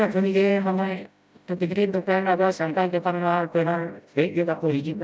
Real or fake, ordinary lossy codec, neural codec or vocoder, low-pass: fake; none; codec, 16 kHz, 0.5 kbps, FreqCodec, smaller model; none